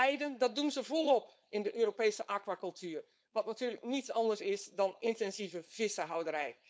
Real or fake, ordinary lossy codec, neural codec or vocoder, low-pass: fake; none; codec, 16 kHz, 4.8 kbps, FACodec; none